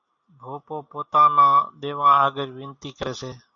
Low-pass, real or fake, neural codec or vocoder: 7.2 kHz; real; none